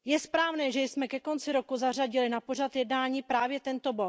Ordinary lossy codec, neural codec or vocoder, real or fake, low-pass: none; none; real; none